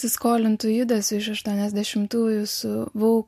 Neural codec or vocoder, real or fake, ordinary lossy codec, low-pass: none; real; MP3, 64 kbps; 14.4 kHz